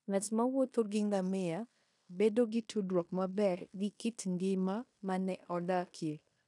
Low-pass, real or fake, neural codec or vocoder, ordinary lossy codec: 10.8 kHz; fake; codec, 16 kHz in and 24 kHz out, 0.9 kbps, LongCat-Audio-Codec, fine tuned four codebook decoder; none